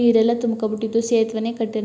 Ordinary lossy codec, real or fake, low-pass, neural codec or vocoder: none; real; none; none